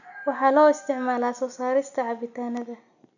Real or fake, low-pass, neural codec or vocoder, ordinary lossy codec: real; 7.2 kHz; none; none